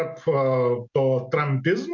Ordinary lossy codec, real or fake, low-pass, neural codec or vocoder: MP3, 48 kbps; real; 7.2 kHz; none